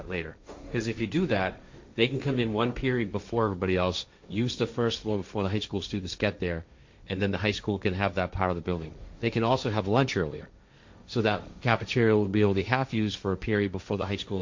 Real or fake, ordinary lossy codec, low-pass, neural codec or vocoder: fake; MP3, 48 kbps; 7.2 kHz; codec, 16 kHz, 1.1 kbps, Voila-Tokenizer